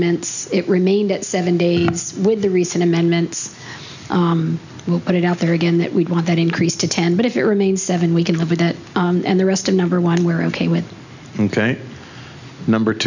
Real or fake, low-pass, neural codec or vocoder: real; 7.2 kHz; none